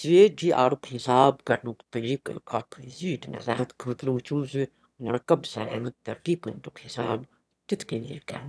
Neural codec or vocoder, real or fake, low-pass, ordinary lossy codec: autoencoder, 22.05 kHz, a latent of 192 numbers a frame, VITS, trained on one speaker; fake; none; none